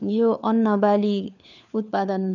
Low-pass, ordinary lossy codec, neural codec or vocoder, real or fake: 7.2 kHz; none; codec, 16 kHz, 4 kbps, FunCodec, trained on LibriTTS, 50 frames a second; fake